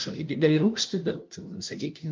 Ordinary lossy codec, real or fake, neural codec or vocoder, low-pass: Opus, 24 kbps; fake; codec, 16 kHz, 0.5 kbps, FunCodec, trained on LibriTTS, 25 frames a second; 7.2 kHz